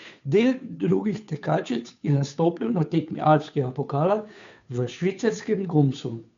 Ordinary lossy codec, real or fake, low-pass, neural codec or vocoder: MP3, 64 kbps; fake; 7.2 kHz; codec, 16 kHz, 2 kbps, FunCodec, trained on Chinese and English, 25 frames a second